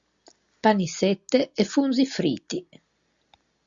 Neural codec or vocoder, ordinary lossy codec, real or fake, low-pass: none; Opus, 64 kbps; real; 7.2 kHz